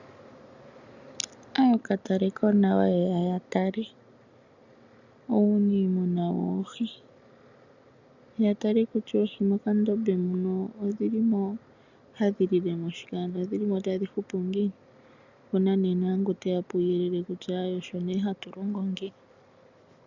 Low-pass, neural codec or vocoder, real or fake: 7.2 kHz; none; real